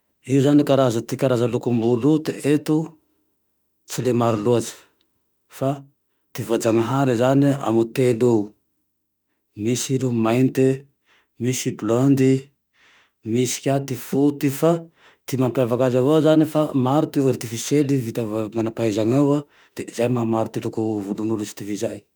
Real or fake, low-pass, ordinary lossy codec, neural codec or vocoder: fake; none; none; autoencoder, 48 kHz, 32 numbers a frame, DAC-VAE, trained on Japanese speech